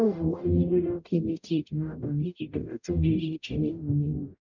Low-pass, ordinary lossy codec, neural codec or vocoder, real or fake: 7.2 kHz; none; codec, 44.1 kHz, 0.9 kbps, DAC; fake